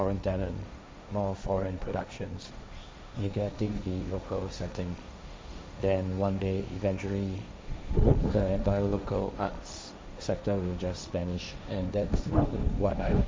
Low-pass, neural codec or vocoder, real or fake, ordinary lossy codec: none; codec, 16 kHz, 1.1 kbps, Voila-Tokenizer; fake; none